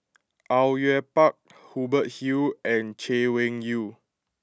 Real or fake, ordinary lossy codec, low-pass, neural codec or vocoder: real; none; none; none